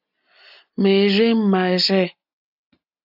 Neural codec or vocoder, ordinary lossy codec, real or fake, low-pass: none; AAC, 48 kbps; real; 5.4 kHz